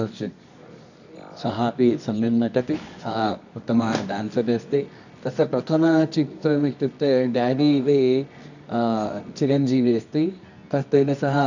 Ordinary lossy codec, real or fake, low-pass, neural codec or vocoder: none; fake; 7.2 kHz; codec, 24 kHz, 0.9 kbps, WavTokenizer, medium music audio release